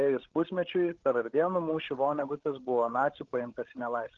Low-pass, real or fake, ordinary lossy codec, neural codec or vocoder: 7.2 kHz; fake; Opus, 16 kbps; codec, 16 kHz, 16 kbps, FreqCodec, larger model